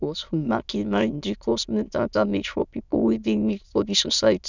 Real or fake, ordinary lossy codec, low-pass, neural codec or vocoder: fake; none; 7.2 kHz; autoencoder, 22.05 kHz, a latent of 192 numbers a frame, VITS, trained on many speakers